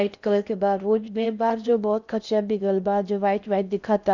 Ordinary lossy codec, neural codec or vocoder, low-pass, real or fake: none; codec, 16 kHz in and 24 kHz out, 0.6 kbps, FocalCodec, streaming, 4096 codes; 7.2 kHz; fake